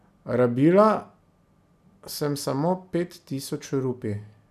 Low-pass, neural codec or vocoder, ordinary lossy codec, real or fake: 14.4 kHz; none; none; real